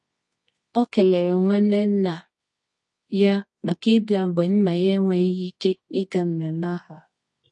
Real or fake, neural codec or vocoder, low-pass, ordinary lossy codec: fake; codec, 24 kHz, 0.9 kbps, WavTokenizer, medium music audio release; 10.8 kHz; MP3, 48 kbps